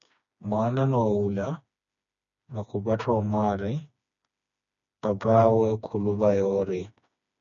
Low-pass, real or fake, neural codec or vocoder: 7.2 kHz; fake; codec, 16 kHz, 2 kbps, FreqCodec, smaller model